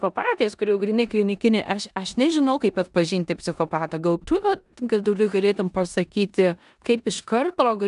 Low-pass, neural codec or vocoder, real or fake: 10.8 kHz; codec, 16 kHz in and 24 kHz out, 0.9 kbps, LongCat-Audio-Codec, four codebook decoder; fake